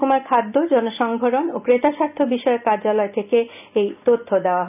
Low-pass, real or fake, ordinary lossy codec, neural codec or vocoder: 3.6 kHz; real; none; none